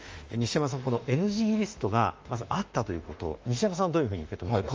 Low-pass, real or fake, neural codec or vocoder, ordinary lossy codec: 7.2 kHz; fake; autoencoder, 48 kHz, 32 numbers a frame, DAC-VAE, trained on Japanese speech; Opus, 24 kbps